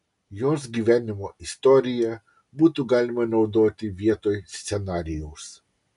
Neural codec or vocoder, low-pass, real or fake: none; 10.8 kHz; real